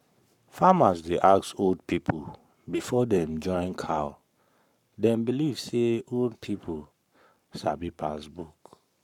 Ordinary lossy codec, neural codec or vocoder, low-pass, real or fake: none; codec, 44.1 kHz, 7.8 kbps, Pupu-Codec; 19.8 kHz; fake